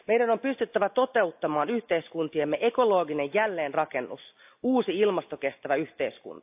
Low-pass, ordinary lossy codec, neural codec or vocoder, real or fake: 3.6 kHz; AAC, 32 kbps; none; real